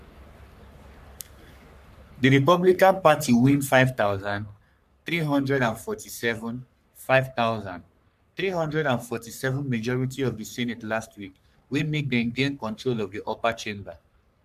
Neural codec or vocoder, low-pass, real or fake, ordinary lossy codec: codec, 44.1 kHz, 3.4 kbps, Pupu-Codec; 14.4 kHz; fake; MP3, 96 kbps